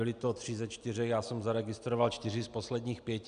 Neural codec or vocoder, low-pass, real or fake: none; 9.9 kHz; real